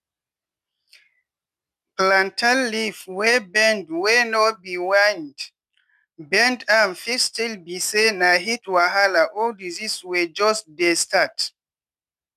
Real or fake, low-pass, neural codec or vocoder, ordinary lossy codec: real; 14.4 kHz; none; none